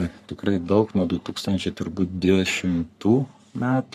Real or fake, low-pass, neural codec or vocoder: fake; 14.4 kHz; codec, 44.1 kHz, 3.4 kbps, Pupu-Codec